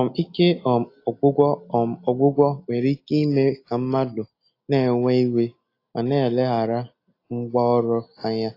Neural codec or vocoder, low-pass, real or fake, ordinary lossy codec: none; 5.4 kHz; real; AAC, 32 kbps